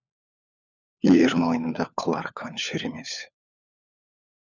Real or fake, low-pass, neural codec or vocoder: fake; 7.2 kHz; codec, 16 kHz, 4 kbps, FunCodec, trained on LibriTTS, 50 frames a second